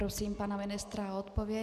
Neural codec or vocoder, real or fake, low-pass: none; real; 14.4 kHz